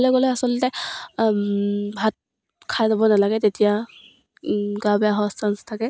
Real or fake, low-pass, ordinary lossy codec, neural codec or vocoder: real; none; none; none